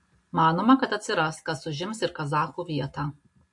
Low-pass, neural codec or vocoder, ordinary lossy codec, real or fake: 10.8 kHz; none; MP3, 48 kbps; real